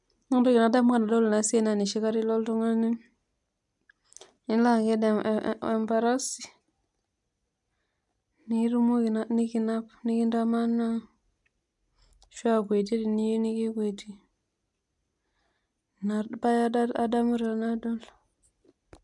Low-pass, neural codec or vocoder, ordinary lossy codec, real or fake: 10.8 kHz; none; none; real